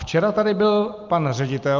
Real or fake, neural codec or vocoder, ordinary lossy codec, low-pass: real; none; Opus, 24 kbps; 7.2 kHz